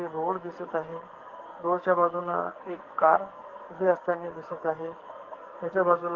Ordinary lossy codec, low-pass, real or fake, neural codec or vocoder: Opus, 16 kbps; 7.2 kHz; fake; codec, 44.1 kHz, 2.6 kbps, SNAC